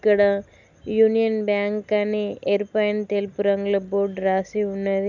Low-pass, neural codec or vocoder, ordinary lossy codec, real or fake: 7.2 kHz; none; none; real